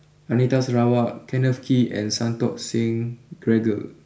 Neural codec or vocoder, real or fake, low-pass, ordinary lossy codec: none; real; none; none